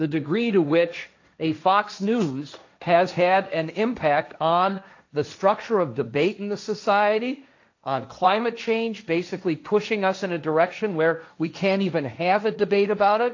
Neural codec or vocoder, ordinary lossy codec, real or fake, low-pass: codec, 16 kHz, 1.1 kbps, Voila-Tokenizer; AAC, 48 kbps; fake; 7.2 kHz